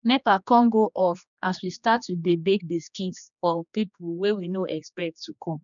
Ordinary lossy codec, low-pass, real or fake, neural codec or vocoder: none; 7.2 kHz; fake; codec, 16 kHz, 2 kbps, X-Codec, HuBERT features, trained on general audio